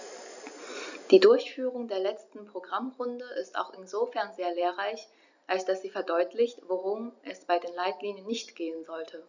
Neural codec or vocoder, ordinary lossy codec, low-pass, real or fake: none; none; 7.2 kHz; real